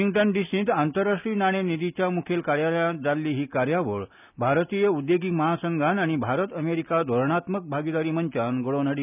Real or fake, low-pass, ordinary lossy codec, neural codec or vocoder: real; 3.6 kHz; none; none